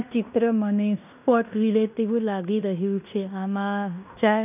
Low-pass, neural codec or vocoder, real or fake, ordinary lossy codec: 3.6 kHz; codec, 16 kHz in and 24 kHz out, 0.9 kbps, LongCat-Audio-Codec, fine tuned four codebook decoder; fake; none